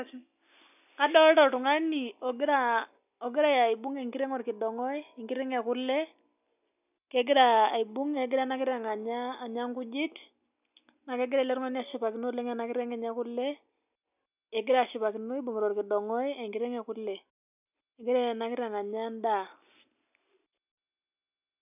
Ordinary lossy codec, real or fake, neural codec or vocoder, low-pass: none; real; none; 3.6 kHz